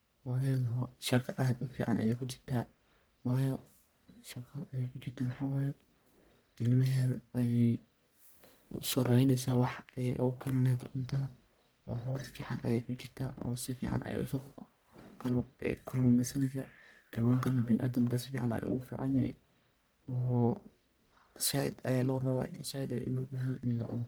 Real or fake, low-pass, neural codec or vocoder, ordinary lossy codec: fake; none; codec, 44.1 kHz, 1.7 kbps, Pupu-Codec; none